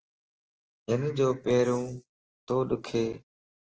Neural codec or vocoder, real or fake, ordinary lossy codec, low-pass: none; real; Opus, 16 kbps; 7.2 kHz